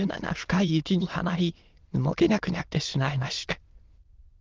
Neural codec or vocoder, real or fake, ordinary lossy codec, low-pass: autoencoder, 22.05 kHz, a latent of 192 numbers a frame, VITS, trained on many speakers; fake; Opus, 16 kbps; 7.2 kHz